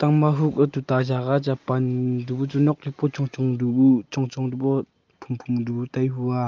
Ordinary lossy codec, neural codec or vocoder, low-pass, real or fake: Opus, 24 kbps; none; 7.2 kHz; real